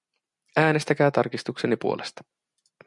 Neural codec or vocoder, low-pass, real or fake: none; 10.8 kHz; real